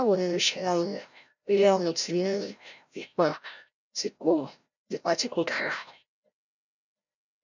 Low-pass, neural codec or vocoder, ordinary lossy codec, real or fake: 7.2 kHz; codec, 16 kHz, 0.5 kbps, FreqCodec, larger model; none; fake